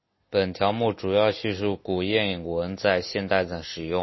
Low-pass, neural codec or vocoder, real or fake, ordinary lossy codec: 7.2 kHz; none; real; MP3, 24 kbps